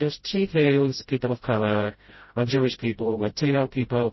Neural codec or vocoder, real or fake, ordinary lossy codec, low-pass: codec, 16 kHz, 0.5 kbps, FreqCodec, smaller model; fake; MP3, 24 kbps; 7.2 kHz